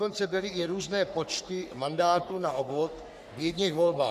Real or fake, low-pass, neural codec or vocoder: fake; 14.4 kHz; codec, 44.1 kHz, 3.4 kbps, Pupu-Codec